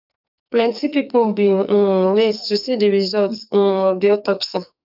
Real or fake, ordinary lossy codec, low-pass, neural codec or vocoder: fake; none; 5.4 kHz; codec, 44.1 kHz, 2.6 kbps, SNAC